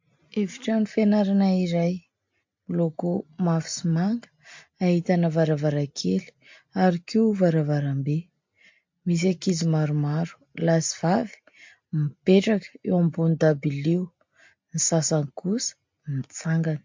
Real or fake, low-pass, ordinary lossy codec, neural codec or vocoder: real; 7.2 kHz; MP3, 48 kbps; none